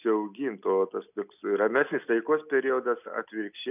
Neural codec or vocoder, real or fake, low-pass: none; real; 3.6 kHz